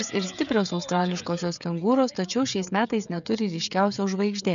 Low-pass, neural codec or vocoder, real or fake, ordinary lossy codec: 7.2 kHz; codec, 16 kHz, 16 kbps, FreqCodec, smaller model; fake; MP3, 96 kbps